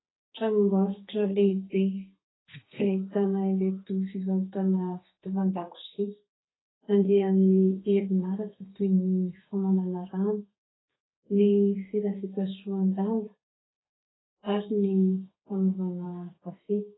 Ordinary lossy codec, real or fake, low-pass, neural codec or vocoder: AAC, 16 kbps; fake; 7.2 kHz; codec, 32 kHz, 1.9 kbps, SNAC